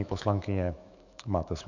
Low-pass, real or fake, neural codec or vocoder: 7.2 kHz; fake; autoencoder, 48 kHz, 128 numbers a frame, DAC-VAE, trained on Japanese speech